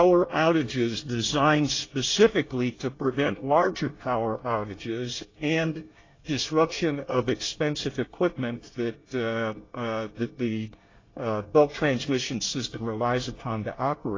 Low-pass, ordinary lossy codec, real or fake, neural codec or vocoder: 7.2 kHz; AAC, 32 kbps; fake; codec, 24 kHz, 1 kbps, SNAC